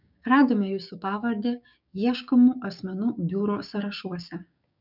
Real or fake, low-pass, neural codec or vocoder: fake; 5.4 kHz; codec, 16 kHz, 6 kbps, DAC